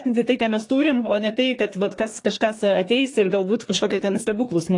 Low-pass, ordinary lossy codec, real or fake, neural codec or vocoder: 10.8 kHz; AAC, 48 kbps; fake; codec, 24 kHz, 1 kbps, SNAC